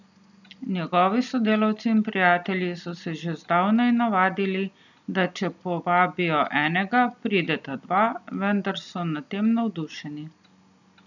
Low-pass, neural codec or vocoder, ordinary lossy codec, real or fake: 7.2 kHz; none; none; real